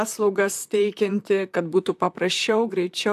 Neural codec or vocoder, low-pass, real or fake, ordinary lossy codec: vocoder, 44.1 kHz, 128 mel bands, Pupu-Vocoder; 14.4 kHz; fake; AAC, 96 kbps